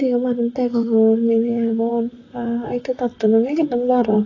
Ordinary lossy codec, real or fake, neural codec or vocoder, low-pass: AAC, 32 kbps; fake; vocoder, 44.1 kHz, 128 mel bands, Pupu-Vocoder; 7.2 kHz